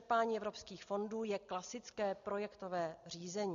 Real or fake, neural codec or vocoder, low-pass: real; none; 7.2 kHz